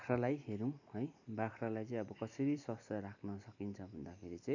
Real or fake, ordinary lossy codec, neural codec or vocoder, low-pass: fake; none; vocoder, 44.1 kHz, 128 mel bands every 256 samples, BigVGAN v2; 7.2 kHz